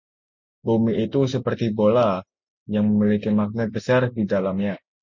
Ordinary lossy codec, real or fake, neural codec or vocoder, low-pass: MP3, 64 kbps; real; none; 7.2 kHz